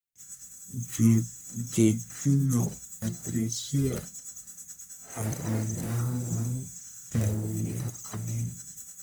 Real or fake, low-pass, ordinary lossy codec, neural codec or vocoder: fake; none; none; codec, 44.1 kHz, 1.7 kbps, Pupu-Codec